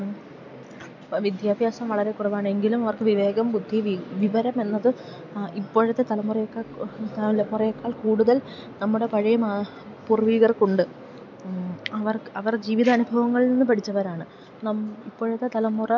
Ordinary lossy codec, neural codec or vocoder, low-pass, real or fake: none; none; 7.2 kHz; real